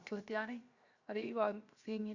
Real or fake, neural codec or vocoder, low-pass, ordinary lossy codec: fake; codec, 16 kHz, 0.7 kbps, FocalCodec; 7.2 kHz; Opus, 64 kbps